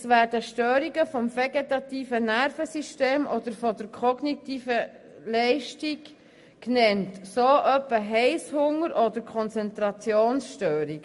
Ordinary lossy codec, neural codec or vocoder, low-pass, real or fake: MP3, 48 kbps; none; 14.4 kHz; real